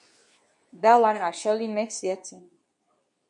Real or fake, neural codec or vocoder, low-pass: fake; codec, 24 kHz, 0.9 kbps, WavTokenizer, medium speech release version 2; 10.8 kHz